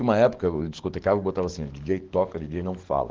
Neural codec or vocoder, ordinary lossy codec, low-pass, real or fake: codec, 44.1 kHz, 7.8 kbps, DAC; Opus, 16 kbps; 7.2 kHz; fake